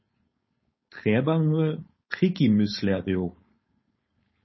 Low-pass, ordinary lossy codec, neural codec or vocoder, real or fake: 7.2 kHz; MP3, 24 kbps; codec, 16 kHz, 4.8 kbps, FACodec; fake